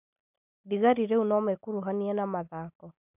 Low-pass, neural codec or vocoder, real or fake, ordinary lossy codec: 3.6 kHz; none; real; none